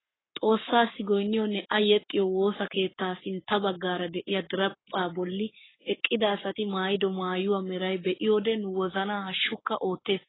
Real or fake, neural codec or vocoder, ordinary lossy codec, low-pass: fake; codec, 44.1 kHz, 7.8 kbps, Pupu-Codec; AAC, 16 kbps; 7.2 kHz